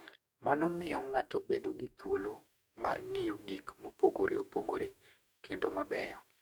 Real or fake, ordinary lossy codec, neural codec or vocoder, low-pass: fake; none; codec, 44.1 kHz, 2.6 kbps, DAC; none